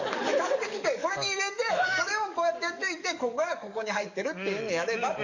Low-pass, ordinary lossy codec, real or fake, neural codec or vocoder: 7.2 kHz; none; fake; codec, 44.1 kHz, 7.8 kbps, DAC